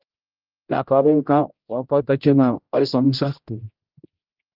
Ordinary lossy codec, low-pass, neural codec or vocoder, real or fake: Opus, 24 kbps; 5.4 kHz; codec, 16 kHz, 0.5 kbps, X-Codec, HuBERT features, trained on general audio; fake